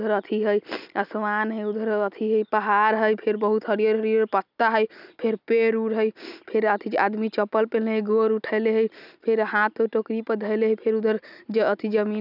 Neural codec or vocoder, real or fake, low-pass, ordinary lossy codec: none; real; 5.4 kHz; none